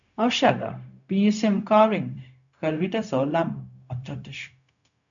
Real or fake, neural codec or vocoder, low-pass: fake; codec, 16 kHz, 0.4 kbps, LongCat-Audio-Codec; 7.2 kHz